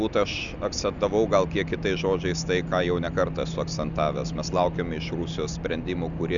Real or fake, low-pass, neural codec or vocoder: real; 7.2 kHz; none